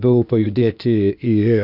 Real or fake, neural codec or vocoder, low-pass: fake; codec, 16 kHz, 0.8 kbps, ZipCodec; 5.4 kHz